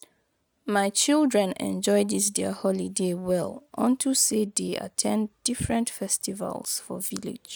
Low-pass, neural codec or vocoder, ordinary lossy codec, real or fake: none; none; none; real